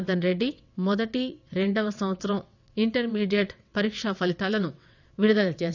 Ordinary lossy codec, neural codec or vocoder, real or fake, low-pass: none; vocoder, 22.05 kHz, 80 mel bands, WaveNeXt; fake; 7.2 kHz